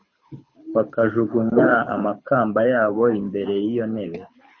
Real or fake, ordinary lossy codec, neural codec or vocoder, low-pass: fake; MP3, 32 kbps; codec, 24 kHz, 6 kbps, HILCodec; 7.2 kHz